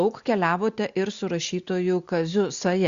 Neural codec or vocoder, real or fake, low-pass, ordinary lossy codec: none; real; 7.2 kHz; Opus, 64 kbps